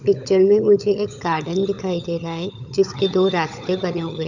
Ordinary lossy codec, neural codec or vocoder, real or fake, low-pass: none; codec, 16 kHz, 16 kbps, FunCodec, trained on LibriTTS, 50 frames a second; fake; 7.2 kHz